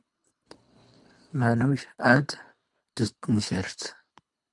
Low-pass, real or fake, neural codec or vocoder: 10.8 kHz; fake; codec, 24 kHz, 3 kbps, HILCodec